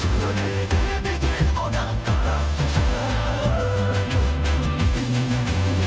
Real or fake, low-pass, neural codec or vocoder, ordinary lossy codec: fake; none; codec, 16 kHz, 0.5 kbps, FunCodec, trained on Chinese and English, 25 frames a second; none